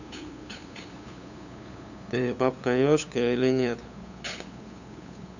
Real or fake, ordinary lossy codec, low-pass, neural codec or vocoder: fake; none; 7.2 kHz; codec, 16 kHz, 8 kbps, FunCodec, trained on LibriTTS, 25 frames a second